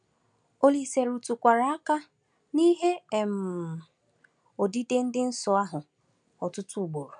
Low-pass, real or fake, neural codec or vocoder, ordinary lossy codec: 9.9 kHz; real; none; none